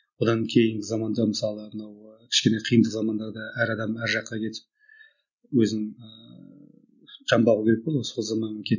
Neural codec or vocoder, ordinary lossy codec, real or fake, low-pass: none; none; real; 7.2 kHz